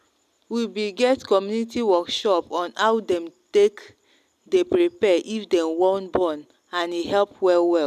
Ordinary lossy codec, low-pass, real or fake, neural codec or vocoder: none; 14.4 kHz; real; none